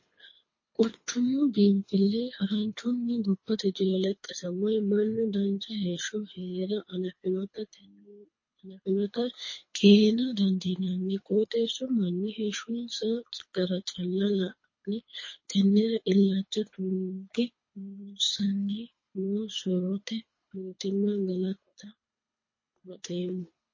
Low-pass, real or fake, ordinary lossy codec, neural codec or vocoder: 7.2 kHz; fake; MP3, 32 kbps; codec, 24 kHz, 3 kbps, HILCodec